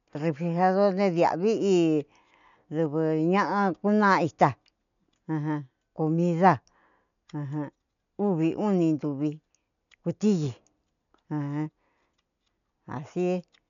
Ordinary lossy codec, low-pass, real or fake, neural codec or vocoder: none; 7.2 kHz; real; none